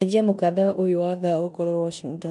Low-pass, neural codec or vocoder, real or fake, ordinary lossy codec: 10.8 kHz; codec, 16 kHz in and 24 kHz out, 0.9 kbps, LongCat-Audio-Codec, four codebook decoder; fake; none